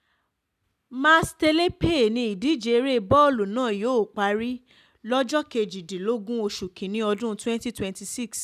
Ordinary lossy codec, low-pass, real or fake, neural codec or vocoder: none; 14.4 kHz; real; none